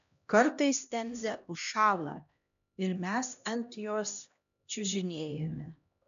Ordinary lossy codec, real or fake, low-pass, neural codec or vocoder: AAC, 96 kbps; fake; 7.2 kHz; codec, 16 kHz, 1 kbps, X-Codec, HuBERT features, trained on LibriSpeech